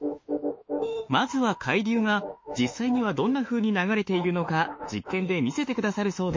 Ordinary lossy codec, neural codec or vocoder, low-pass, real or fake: MP3, 32 kbps; autoencoder, 48 kHz, 32 numbers a frame, DAC-VAE, trained on Japanese speech; 7.2 kHz; fake